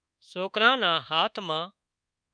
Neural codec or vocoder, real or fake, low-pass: codec, 24 kHz, 1.2 kbps, DualCodec; fake; 9.9 kHz